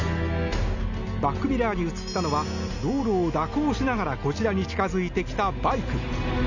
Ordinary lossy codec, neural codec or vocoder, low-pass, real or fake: none; none; 7.2 kHz; real